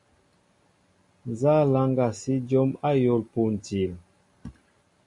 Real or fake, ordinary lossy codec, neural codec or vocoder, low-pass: real; MP3, 48 kbps; none; 10.8 kHz